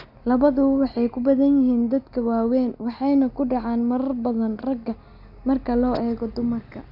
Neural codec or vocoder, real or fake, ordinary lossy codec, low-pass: none; real; none; 5.4 kHz